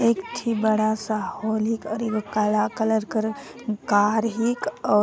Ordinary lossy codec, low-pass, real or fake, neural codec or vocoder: none; none; real; none